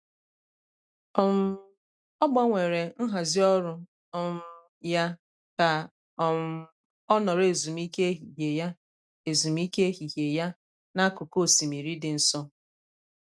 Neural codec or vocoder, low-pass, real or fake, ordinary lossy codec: none; none; real; none